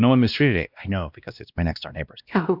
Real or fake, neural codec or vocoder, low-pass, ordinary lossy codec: fake; codec, 16 kHz, 1 kbps, X-Codec, WavLM features, trained on Multilingual LibriSpeech; 5.4 kHz; AAC, 48 kbps